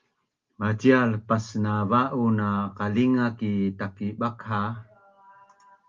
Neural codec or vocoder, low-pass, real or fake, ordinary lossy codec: none; 7.2 kHz; real; Opus, 32 kbps